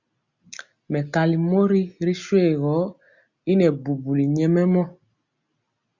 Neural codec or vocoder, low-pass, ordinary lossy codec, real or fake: none; 7.2 kHz; Opus, 64 kbps; real